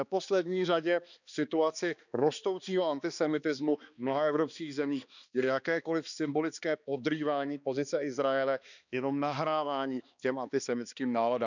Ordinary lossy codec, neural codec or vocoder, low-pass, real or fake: none; codec, 16 kHz, 2 kbps, X-Codec, HuBERT features, trained on balanced general audio; 7.2 kHz; fake